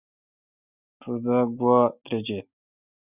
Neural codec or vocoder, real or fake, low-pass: none; real; 3.6 kHz